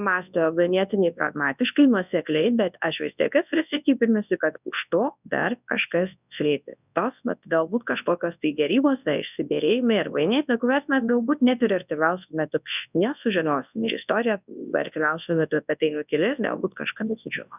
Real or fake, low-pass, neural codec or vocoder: fake; 3.6 kHz; codec, 24 kHz, 0.9 kbps, WavTokenizer, large speech release